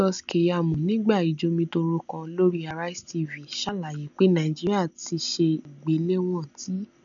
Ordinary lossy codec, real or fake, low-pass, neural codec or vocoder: none; real; 7.2 kHz; none